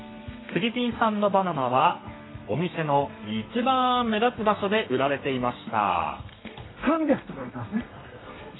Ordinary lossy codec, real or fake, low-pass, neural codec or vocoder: AAC, 16 kbps; fake; 7.2 kHz; codec, 32 kHz, 1.9 kbps, SNAC